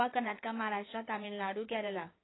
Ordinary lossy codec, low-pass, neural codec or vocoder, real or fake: AAC, 16 kbps; 7.2 kHz; codec, 16 kHz in and 24 kHz out, 2.2 kbps, FireRedTTS-2 codec; fake